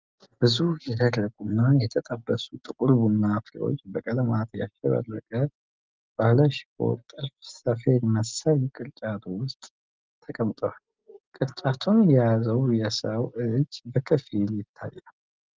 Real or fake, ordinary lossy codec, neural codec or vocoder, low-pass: real; Opus, 24 kbps; none; 7.2 kHz